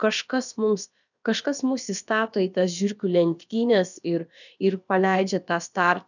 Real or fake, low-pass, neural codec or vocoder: fake; 7.2 kHz; codec, 16 kHz, about 1 kbps, DyCAST, with the encoder's durations